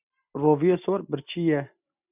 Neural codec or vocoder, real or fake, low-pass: none; real; 3.6 kHz